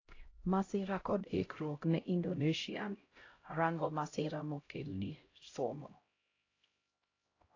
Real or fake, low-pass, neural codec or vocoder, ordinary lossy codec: fake; 7.2 kHz; codec, 16 kHz, 0.5 kbps, X-Codec, HuBERT features, trained on LibriSpeech; AAC, 32 kbps